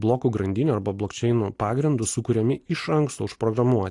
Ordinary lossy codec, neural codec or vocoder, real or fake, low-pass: AAC, 48 kbps; none; real; 10.8 kHz